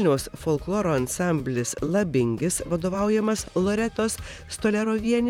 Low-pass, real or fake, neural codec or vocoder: 19.8 kHz; fake; vocoder, 44.1 kHz, 128 mel bands, Pupu-Vocoder